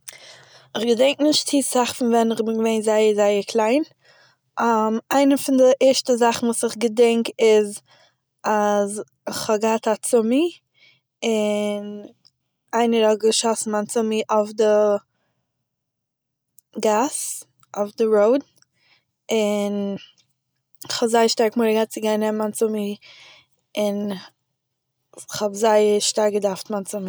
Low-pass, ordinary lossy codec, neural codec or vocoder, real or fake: none; none; none; real